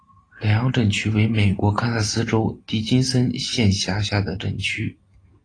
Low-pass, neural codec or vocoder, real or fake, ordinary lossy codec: 9.9 kHz; none; real; AAC, 32 kbps